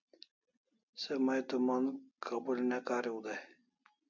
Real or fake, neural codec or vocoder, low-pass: real; none; 7.2 kHz